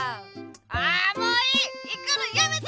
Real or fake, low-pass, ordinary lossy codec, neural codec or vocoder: real; none; none; none